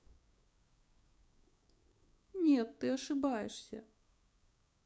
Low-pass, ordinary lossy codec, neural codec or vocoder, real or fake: none; none; codec, 16 kHz, 6 kbps, DAC; fake